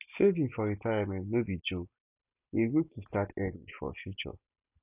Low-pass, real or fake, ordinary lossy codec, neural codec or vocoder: 3.6 kHz; real; none; none